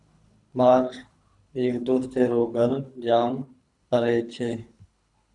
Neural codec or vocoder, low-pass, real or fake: codec, 24 kHz, 3 kbps, HILCodec; 10.8 kHz; fake